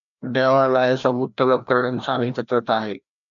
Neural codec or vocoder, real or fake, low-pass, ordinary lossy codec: codec, 16 kHz, 1 kbps, FreqCodec, larger model; fake; 7.2 kHz; MP3, 96 kbps